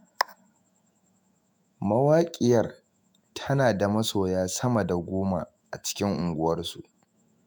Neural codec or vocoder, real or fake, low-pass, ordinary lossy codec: autoencoder, 48 kHz, 128 numbers a frame, DAC-VAE, trained on Japanese speech; fake; none; none